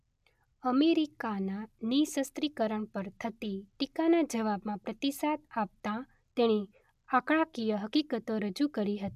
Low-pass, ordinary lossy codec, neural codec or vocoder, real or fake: 14.4 kHz; none; none; real